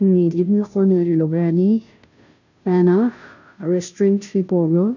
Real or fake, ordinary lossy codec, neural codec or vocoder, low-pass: fake; none; codec, 16 kHz, 0.5 kbps, FunCodec, trained on Chinese and English, 25 frames a second; 7.2 kHz